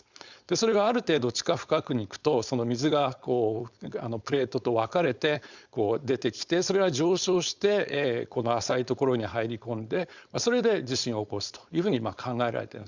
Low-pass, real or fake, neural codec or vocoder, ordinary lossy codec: 7.2 kHz; fake; codec, 16 kHz, 4.8 kbps, FACodec; Opus, 64 kbps